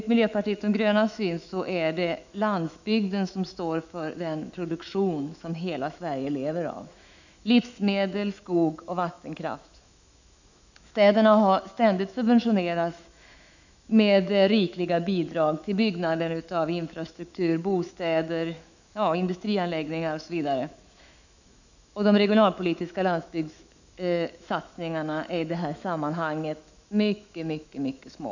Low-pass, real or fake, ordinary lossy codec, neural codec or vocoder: 7.2 kHz; fake; none; autoencoder, 48 kHz, 128 numbers a frame, DAC-VAE, trained on Japanese speech